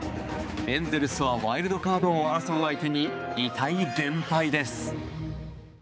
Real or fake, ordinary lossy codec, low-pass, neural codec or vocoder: fake; none; none; codec, 16 kHz, 4 kbps, X-Codec, HuBERT features, trained on balanced general audio